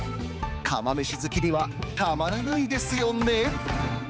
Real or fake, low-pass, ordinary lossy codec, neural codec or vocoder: fake; none; none; codec, 16 kHz, 4 kbps, X-Codec, HuBERT features, trained on balanced general audio